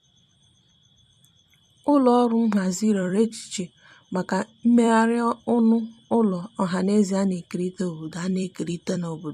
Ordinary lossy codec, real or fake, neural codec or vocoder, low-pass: MP3, 64 kbps; fake; vocoder, 44.1 kHz, 128 mel bands every 256 samples, BigVGAN v2; 14.4 kHz